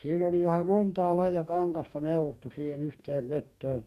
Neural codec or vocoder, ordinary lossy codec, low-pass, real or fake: codec, 44.1 kHz, 2.6 kbps, DAC; MP3, 96 kbps; 14.4 kHz; fake